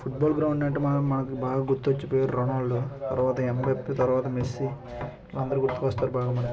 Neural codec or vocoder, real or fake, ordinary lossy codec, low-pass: none; real; none; none